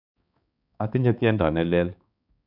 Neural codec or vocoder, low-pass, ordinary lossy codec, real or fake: codec, 16 kHz, 4 kbps, X-Codec, HuBERT features, trained on balanced general audio; 5.4 kHz; none; fake